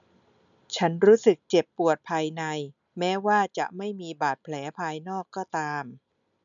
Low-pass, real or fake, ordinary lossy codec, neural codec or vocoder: 7.2 kHz; real; none; none